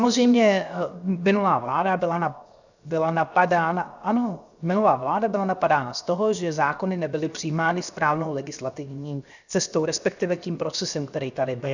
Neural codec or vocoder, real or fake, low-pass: codec, 16 kHz, 0.7 kbps, FocalCodec; fake; 7.2 kHz